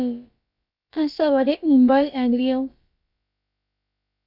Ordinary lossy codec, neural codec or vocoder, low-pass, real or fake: AAC, 48 kbps; codec, 16 kHz, about 1 kbps, DyCAST, with the encoder's durations; 5.4 kHz; fake